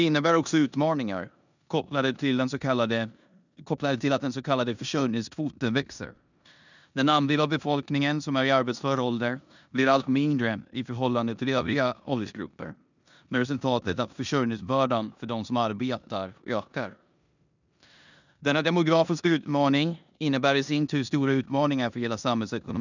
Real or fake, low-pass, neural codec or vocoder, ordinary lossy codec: fake; 7.2 kHz; codec, 16 kHz in and 24 kHz out, 0.9 kbps, LongCat-Audio-Codec, four codebook decoder; none